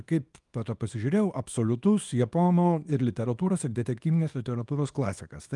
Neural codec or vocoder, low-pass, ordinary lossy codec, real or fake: codec, 24 kHz, 0.9 kbps, WavTokenizer, small release; 10.8 kHz; Opus, 32 kbps; fake